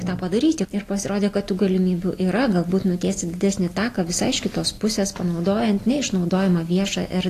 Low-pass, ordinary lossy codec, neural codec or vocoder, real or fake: 14.4 kHz; AAC, 48 kbps; none; real